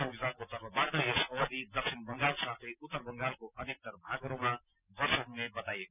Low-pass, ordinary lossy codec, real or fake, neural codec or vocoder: 3.6 kHz; none; real; none